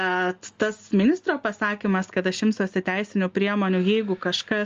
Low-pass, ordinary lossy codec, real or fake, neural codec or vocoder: 7.2 kHz; Opus, 32 kbps; real; none